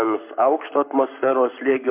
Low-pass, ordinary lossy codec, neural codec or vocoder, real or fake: 3.6 kHz; MP3, 32 kbps; codec, 44.1 kHz, 7.8 kbps, Pupu-Codec; fake